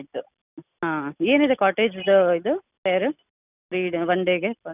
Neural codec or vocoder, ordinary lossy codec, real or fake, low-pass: none; none; real; 3.6 kHz